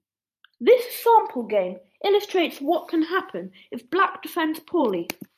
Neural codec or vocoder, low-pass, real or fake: vocoder, 44.1 kHz, 128 mel bands every 512 samples, BigVGAN v2; 14.4 kHz; fake